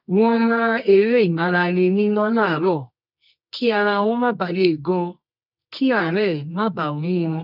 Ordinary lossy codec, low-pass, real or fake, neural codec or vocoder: none; 5.4 kHz; fake; codec, 24 kHz, 0.9 kbps, WavTokenizer, medium music audio release